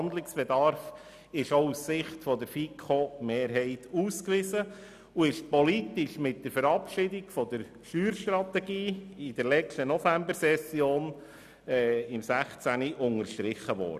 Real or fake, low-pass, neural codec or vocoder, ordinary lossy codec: real; 14.4 kHz; none; none